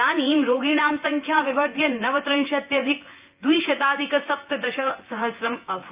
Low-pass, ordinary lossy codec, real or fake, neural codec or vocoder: 3.6 kHz; Opus, 24 kbps; fake; vocoder, 24 kHz, 100 mel bands, Vocos